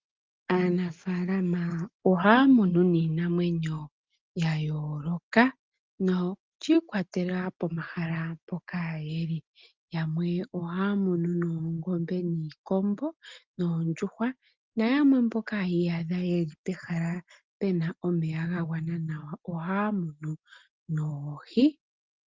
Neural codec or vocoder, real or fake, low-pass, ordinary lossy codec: none; real; 7.2 kHz; Opus, 32 kbps